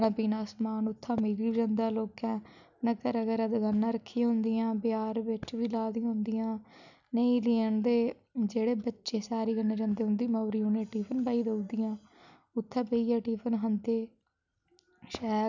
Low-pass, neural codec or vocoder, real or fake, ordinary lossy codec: 7.2 kHz; none; real; none